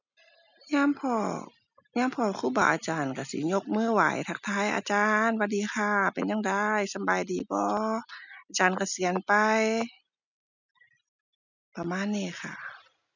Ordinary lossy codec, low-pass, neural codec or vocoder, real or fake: none; 7.2 kHz; none; real